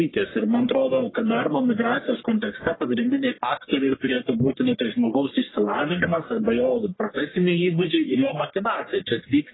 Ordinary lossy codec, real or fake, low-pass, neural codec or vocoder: AAC, 16 kbps; fake; 7.2 kHz; codec, 44.1 kHz, 1.7 kbps, Pupu-Codec